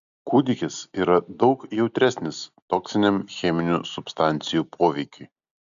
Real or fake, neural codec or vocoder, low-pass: real; none; 7.2 kHz